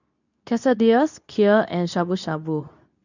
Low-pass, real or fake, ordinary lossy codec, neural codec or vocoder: 7.2 kHz; fake; none; codec, 24 kHz, 0.9 kbps, WavTokenizer, medium speech release version 2